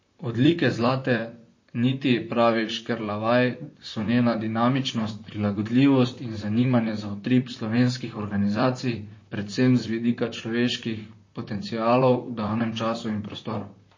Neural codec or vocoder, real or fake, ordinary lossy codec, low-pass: vocoder, 44.1 kHz, 128 mel bands, Pupu-Vocoder; fake; MP3, 32 kbps; 7.2 kHz